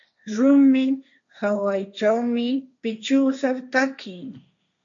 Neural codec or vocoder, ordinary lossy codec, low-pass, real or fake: codec, 16 kHz, 1.1 kbps, Voila-Tokenizer; MP3, 48 kbps; 7.2 kHz; fake